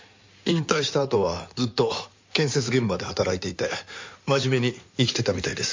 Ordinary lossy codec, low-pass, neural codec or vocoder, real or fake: MP3, 48 kbps; 7.2 kHz; codec, 16 kHz in and 24 kHz out, 2.2 kbps, FireRedTTS-2 codec; fake